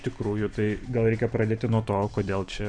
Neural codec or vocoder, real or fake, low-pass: vocoder, 44.1 kHz, 128 mel bands every 256 samples, BigVGAN v2; fake; 9.9 kHz